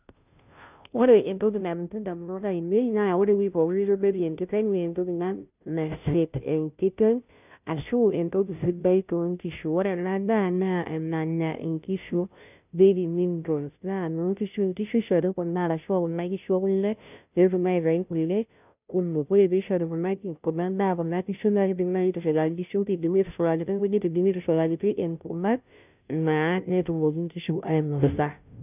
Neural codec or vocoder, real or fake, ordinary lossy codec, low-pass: codec, 16 kHz, 0.5 kbps, FunCodec, trained on Chinese and English, 25 frames a second; fake; none; 3.6 kHz